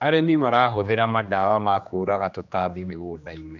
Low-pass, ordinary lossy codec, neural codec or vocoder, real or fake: 7.2 kHz; none; codec, 16 kHz, 2 kbps, X-Codec, HuBERT features, trained on general audio; fake